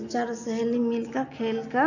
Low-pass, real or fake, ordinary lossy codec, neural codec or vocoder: 7.2 kHz; real; none; none